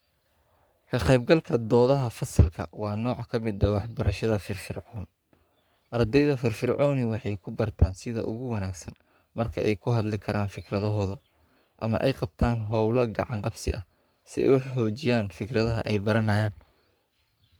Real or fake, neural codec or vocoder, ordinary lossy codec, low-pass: fake; codec, 44.1 kHz, 3.4 kbps, Pupu-Codec; none; none